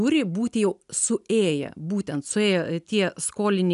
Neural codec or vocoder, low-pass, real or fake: none; 10.8 kHz; real